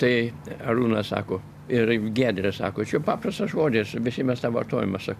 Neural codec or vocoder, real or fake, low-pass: none; real; 14.4 kHz